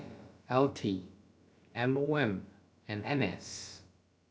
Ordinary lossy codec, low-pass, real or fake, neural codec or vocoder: none; none; fake; codec, 16 kHz, about 1 kbps, DyCAST, with the encoder's durations